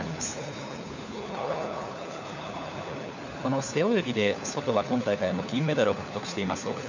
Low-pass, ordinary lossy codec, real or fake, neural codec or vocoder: 7.2 kHz; none; fake; codec, 16 kHz, 4 kbps, FunCodec, trained on LibriTTS, 50 frames a second